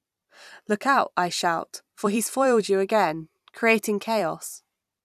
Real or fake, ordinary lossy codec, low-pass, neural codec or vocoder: fake; none; 14.4 kHz; vocoder, 44.1 kHz, 128 mel bands every 256 samples, BigVGAN v2